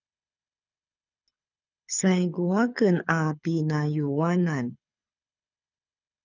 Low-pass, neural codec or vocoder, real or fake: 7.2 kHz; codec, 24 kHz, 6 kbps, HILCodec; fake